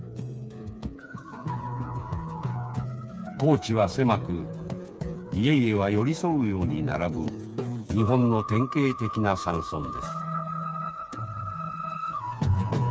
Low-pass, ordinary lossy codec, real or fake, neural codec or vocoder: none; none; fake; codec, 16 kHz, 4 kbps, FreqCodec, smaller model